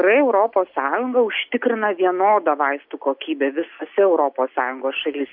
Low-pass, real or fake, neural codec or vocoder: 5.4 kHz; real; none